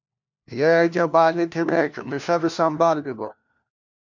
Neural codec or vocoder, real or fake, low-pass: codec, 16 kHz, 1 kbps, FunCodec, trained on LibriTTS, 50 frames a second; fake; 7.2 kHz